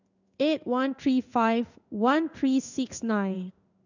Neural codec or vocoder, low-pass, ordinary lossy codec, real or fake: codec, 16 kHz in and 24 kHz out, 1 kbps, XY-Tokenizer; 7.2 kHz; none; fake